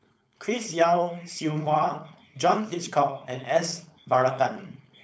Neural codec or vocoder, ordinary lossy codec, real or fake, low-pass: codec, 16 kHz, 4.8 kbps, FACodec; none; fake; none